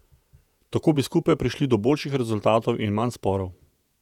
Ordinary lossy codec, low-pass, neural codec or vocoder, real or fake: none; 19.8 kHz; vocoder, 48 kHz, 128 mel bands, Vocos; fake